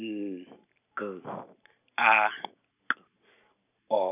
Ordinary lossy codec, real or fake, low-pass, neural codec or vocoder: none; real; 3.6 kHz; none